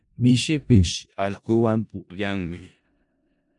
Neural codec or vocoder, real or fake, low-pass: codec, 16 kHz in and 24 kHz out, 0.4 kbps, LongCat-Audio-Codec, four codebook decoder; fake; 10.8 kHz